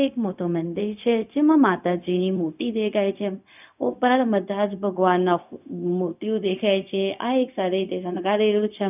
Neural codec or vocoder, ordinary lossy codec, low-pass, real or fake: codec, 16 kHz, 0.4 kbps, LongCat-Audio-Codec; none; 3.6 kHz; fake